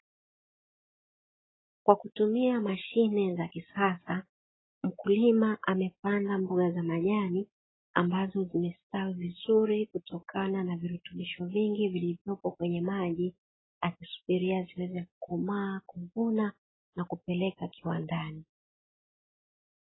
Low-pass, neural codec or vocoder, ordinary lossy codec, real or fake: 7.2 kHz; none; AAC, 16 kbps; real